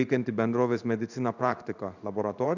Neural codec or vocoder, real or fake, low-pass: codec, 16 kHz in and 24 kHz out, 1 kbps, XY-Tokenizer; fake; 7.2 kHz